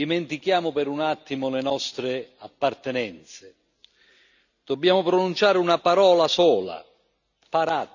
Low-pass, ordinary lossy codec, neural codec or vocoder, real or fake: 7.2 kHz; none; none; real